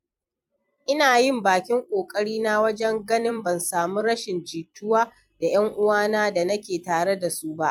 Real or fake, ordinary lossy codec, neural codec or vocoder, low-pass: fake; MP3, 96 kbps; vocoder, 44.1 kHz, 128 mel bands every 256 samples, BigVGAN v2; 19.8 kHz